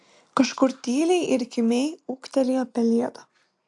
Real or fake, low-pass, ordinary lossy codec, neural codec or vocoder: real; 10.8 kHz; AAC, 64 kbps; none